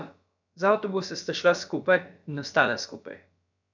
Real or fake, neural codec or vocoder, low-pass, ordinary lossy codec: fake; codec, 16 kHz, about 1 kbps, DyCAST, with the encoder's durations; 7.2 kHz; none